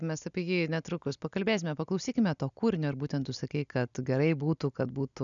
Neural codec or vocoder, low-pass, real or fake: none; 7.2 kHz; real